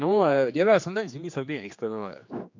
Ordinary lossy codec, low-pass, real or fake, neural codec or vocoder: MP3, 48 kbps; 7.2 kHz; fake; codec, 16 kHz, 2 kbps, X-Codec, HuBERT features, trained on general audio